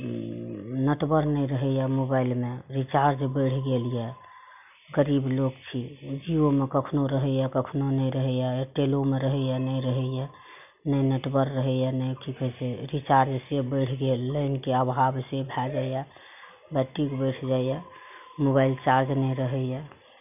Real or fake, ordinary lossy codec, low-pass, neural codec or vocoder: real; none; 3.6 kHz; none